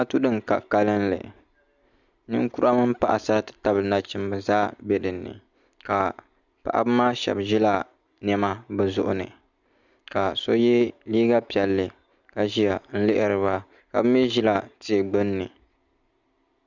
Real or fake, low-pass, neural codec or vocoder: real; 7.2 kHz; none